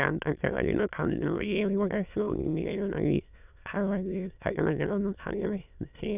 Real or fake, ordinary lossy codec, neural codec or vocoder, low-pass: fake; none; autoencoder, 22.05 kHz, a latent of 192 numbers a frame, VITS, trained on many speakers; 3.6 kHz